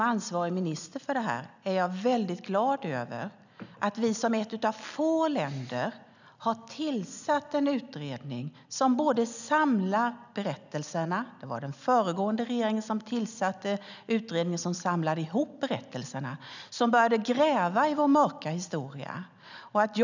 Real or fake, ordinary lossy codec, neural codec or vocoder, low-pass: real; none; none; 7.2 kHz